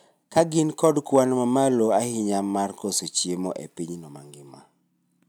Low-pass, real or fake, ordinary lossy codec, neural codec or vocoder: none; real; none; none